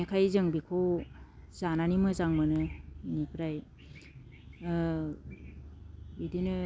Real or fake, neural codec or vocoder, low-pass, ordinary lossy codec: real; none; none; none